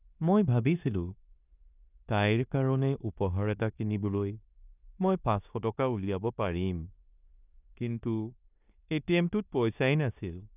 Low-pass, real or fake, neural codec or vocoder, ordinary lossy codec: 3.6 kHz; fake; codec, 16 kHz in and 24 kHz out, 0.9 kbps, LongCat-Audio-Codec, four codebook decoder; none